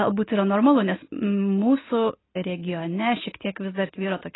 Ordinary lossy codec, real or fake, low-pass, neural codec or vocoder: AAC, 16 kbps; real; 7.2 kHz; none